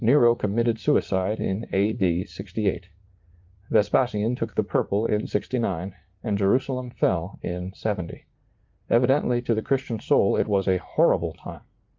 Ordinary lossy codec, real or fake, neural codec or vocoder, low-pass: Opus, 32 kbps; fake; vocoder, 44.1 kHz, 80 mel bands, Vocos; 7.2 kHz